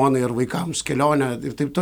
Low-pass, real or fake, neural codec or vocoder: 19.8 kHz; real; none